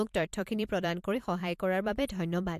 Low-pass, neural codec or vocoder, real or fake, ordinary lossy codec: 14.4 kHz; vocoder, 44.1 kHz, 128 mel bands every 512 samples, BigVGAN v2; fake; MP3, 64 kbps